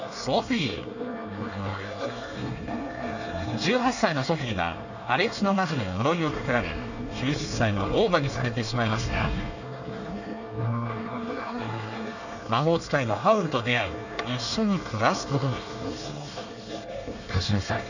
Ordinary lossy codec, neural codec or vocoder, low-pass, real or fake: none; codec, 24 kHz, 1 kbps, SNAC; 7.2 kHz; fake